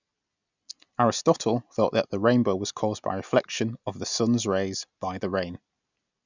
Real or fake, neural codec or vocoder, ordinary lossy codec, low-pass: real; none; none; 7.2 kHz